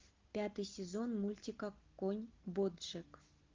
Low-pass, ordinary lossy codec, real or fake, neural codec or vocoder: 7.2 kHz; Opus, 24 kbps; real; none